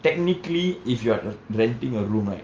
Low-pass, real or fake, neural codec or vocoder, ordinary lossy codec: 7.2 kHz; real; none; Opus, 24 kbps